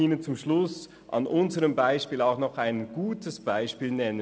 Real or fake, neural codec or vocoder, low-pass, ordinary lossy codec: real; none; none; none